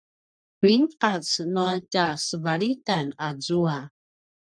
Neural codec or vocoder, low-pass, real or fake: codec, 44.1 kHz, 3.4 kbps, Pupu-Codec; 9.9 kHz; fake